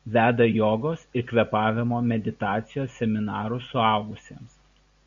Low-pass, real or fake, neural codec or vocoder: 7.2 kHz; real; none